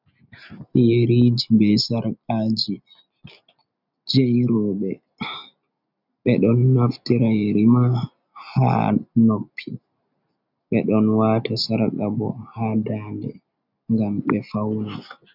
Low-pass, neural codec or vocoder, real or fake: 5.4 kHz; none; real